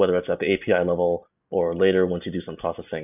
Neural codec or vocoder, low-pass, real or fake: none; 3.6 kHz; real